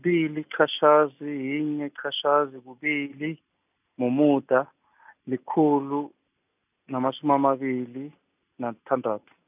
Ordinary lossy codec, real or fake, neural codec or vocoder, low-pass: none; real; none; 3.6 kHz